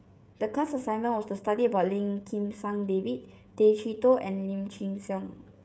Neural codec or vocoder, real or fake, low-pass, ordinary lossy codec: codec, 16 kHz, 16 kbps, FreqCodec, smaller model; fake; none; none